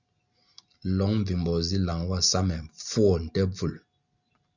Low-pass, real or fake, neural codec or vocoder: 7.2 kHz; real; none